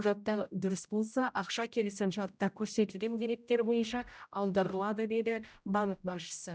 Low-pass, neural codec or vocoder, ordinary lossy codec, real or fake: none; codec, 16 kHz, 0.5 kbps, X-Codec, HuBERT features, trained on general audio; none; fake